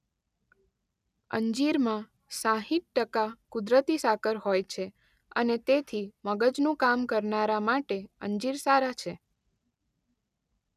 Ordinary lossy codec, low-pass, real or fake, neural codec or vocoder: none; 14.4 kHz; real; none